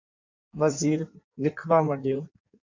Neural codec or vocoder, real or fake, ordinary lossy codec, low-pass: codec, 16 kHz in and 24 kHz out, 1.1 kbps, FireRedTTS-2 codec; fake; MP3, 64 kbps; 7.2 kHz